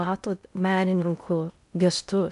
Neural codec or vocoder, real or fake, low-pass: codec, 16 kHz in and 24 kHz out, 0.6 kbps, FocalCodec, streaming, 2048 codes; fake; 10.8 kHz